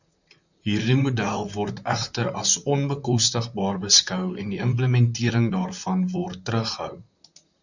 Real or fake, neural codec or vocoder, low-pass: fake; vocoder, 44.1 kHz, 128 mel bands, Pupu-Vocoder; 7.2 kHz